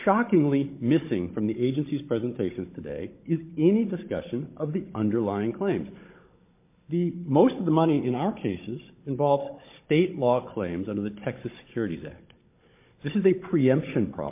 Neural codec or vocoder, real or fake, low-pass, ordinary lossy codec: codec, 16 kHz, 16 kbps, FunCodec, trained on Chinese and English, 50 frames a second; fake; 3.6 kHz; MP3, 24 kbps